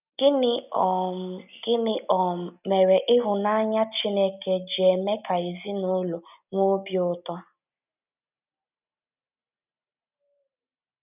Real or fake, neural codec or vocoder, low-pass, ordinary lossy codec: real; none; 3.6 kHz; none